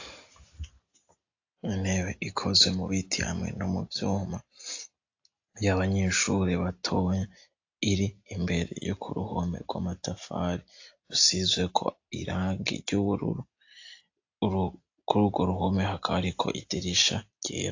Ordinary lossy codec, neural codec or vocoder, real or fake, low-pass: AAC, 48 kbps; none; real; 7.2 kHz